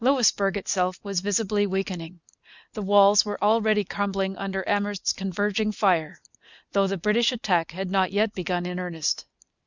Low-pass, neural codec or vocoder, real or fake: 7.2 kHz; none; real